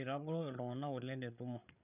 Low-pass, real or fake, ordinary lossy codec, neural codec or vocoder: 3.6 kHz; fake; none; codec, 16 kHz, 8 kbps, FreqCodec, larger model